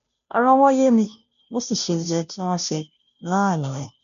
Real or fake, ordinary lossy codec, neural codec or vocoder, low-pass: fake; none; codec, 16 kHz, 0.5 kbps, FunCodec, trained on Chinese and English, 25 frames a second; 7.2 kHz